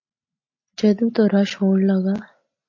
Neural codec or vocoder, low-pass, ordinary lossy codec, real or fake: none; 7.2 kHz; MP3, 32 kbps; real